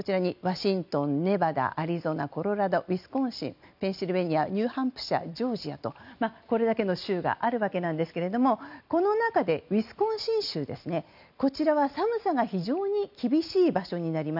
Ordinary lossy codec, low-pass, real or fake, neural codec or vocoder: none; 5.4 kHz; real; none